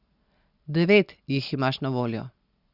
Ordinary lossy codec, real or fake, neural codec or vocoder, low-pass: Opus, 64 kbps; real; none; 5.4 kHz